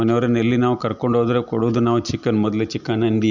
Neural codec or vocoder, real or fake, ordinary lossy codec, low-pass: none; real; none; 7.2 kHz